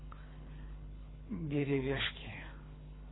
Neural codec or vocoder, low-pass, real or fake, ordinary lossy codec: codec, 24 kHz, 3 kbps, HILCodec; 7.2 kHz; fake; AAC, 16 kbps